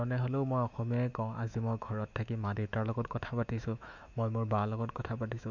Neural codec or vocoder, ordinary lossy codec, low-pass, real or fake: autoencoder, 48 kHz, 128 numbers a frame, DAC-VAE, trained on Japanese speech; none; 7.2 kHz; fake